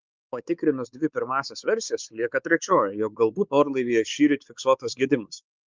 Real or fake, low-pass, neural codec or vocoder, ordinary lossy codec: fake; 7.2 kHz; codec, 16 kHz, 4 kbps, X-Codec, WavLM features, trained on Multilingual LibriSpeech; Opus, 24 kbps